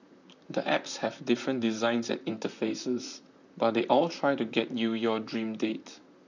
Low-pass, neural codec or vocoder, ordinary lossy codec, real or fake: 7.2 kHz; vocoder, 44.1 kHz, 128 mel bands, Pupu-Vocoder; none; fake